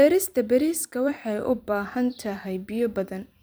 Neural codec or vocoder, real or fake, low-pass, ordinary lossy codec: vocoder, 44.1 kHz, 128 mel bands every 256 samples, BigVGAN v2; fake; none; none